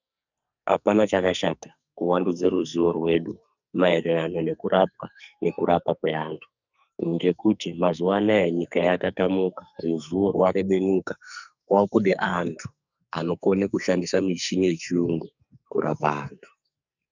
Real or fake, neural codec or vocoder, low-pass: fake; codec, 44.1 kHz, 2.6 kbps, SNAC; 7.2 kHz